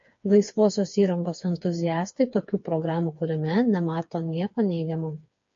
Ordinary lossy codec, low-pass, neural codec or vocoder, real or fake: MP3, 48 kbps; 7.2 kHz; codec, 16 kHz, 4 kbps, FreqCodec, smaller model; fake